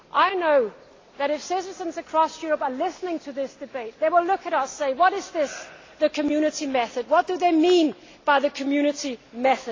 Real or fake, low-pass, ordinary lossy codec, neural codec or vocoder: real; 7.2 kHz; AAC, 32 kbps; none